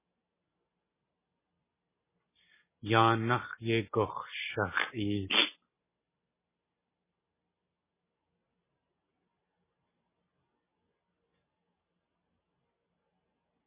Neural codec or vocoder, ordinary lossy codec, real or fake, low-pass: none; MP3, 16 kbps; real; 3.6 kHz